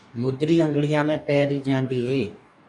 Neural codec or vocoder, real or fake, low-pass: codec, 44.1 kHz, 2.6 kbps, DAC; fake; 10.8 kHz